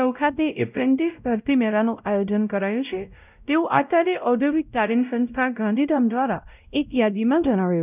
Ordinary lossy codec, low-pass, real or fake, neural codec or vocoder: none; 3.6 kHz; fake; codec, 16 kHz, 0.5 kbps, X-Codec, WavLM features, trained on Multilingual LibriSpeech